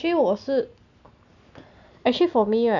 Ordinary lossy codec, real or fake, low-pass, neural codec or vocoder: none; real; 7.2 kHz; none